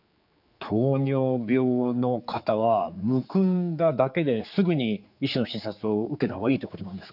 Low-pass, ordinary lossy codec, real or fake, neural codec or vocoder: 5.4 kHz; none; fake; codec, 16 kHz, 4 kbps, X-Codec, HuBERT features, trained on general audio